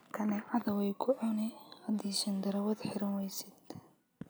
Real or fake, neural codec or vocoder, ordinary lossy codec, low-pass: real; none; none; none